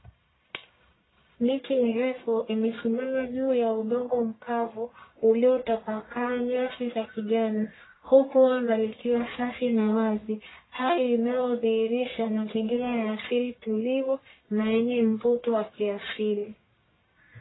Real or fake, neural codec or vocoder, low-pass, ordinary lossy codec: fake; codec, 44.1 kHz, 1.7 kbps, Pupu-Codec; 7.2 kHz; AAC, 16 kbps